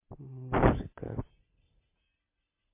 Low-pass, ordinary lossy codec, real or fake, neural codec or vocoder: 3.6 kHz; MP3, 24 kbps; real; none